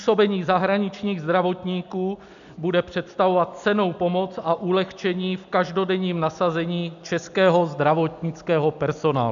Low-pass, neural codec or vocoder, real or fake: 7.2 kHz; none; real